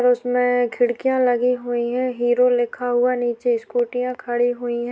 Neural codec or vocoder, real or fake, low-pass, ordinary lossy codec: none; real; none; none